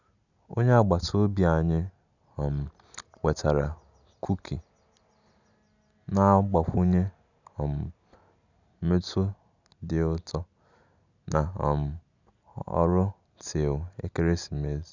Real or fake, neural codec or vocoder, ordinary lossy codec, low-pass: real; none; none; 7.2 kHz